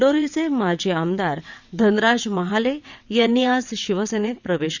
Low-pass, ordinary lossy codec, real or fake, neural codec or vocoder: 7.2 kHz; none; fake; vocoder, 22.05 kHz, 80 mel bands, WaveNeXt